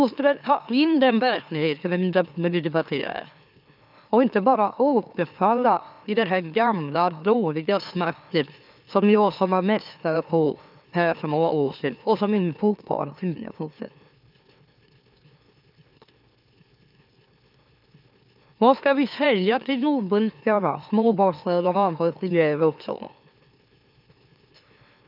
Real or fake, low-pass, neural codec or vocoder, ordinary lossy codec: fake; 5.4 kHz; autoencoder, 44.1 kHz, a latent of 192 numbers a frame, MeloTTS; none